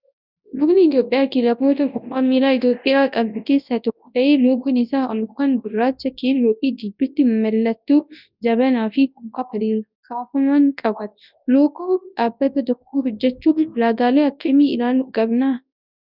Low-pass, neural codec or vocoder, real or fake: 5.4 kHz; codec, 24 kHz, 0.9 kbps, WavTokenizer, large speech release; fake